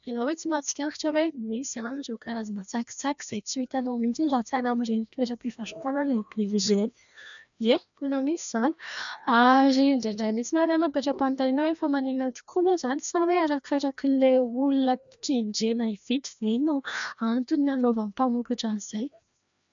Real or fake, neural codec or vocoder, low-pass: fake; codec, 16 kHz, 1 kbps, FreqCodec, larger model; 7.2 kHz